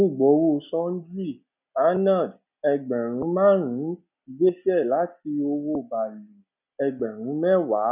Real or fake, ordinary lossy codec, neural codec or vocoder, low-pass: real; none; none; 3.6 kHz